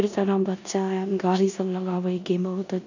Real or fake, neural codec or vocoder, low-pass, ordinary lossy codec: fake; codec, 16 kHz in and 24 kHz out, 0.9 kbps, LongCat-Audio-Codec, four codebook decoder; 7.2 kHz; none